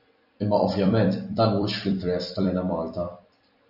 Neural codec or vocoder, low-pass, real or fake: vocoder, 44.1 kHz, 128 mel bands every 256 samples, BigVGAN v2; 5.4 kHz; fake